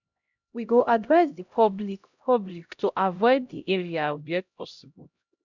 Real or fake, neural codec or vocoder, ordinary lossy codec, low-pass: fake; codec, 16 kHz, 0.5 kbps, X-Codec, HuBERT features, trained on LibriSpeech; none; 7.2 kHz